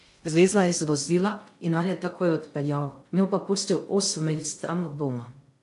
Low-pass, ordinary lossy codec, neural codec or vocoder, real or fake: 10.8 kHz; AAC, 64 kbps; codec, 16 kHz in and 24 kHz out, 0.6 kbps, FocalCodec, streaming, 2048 codes; fake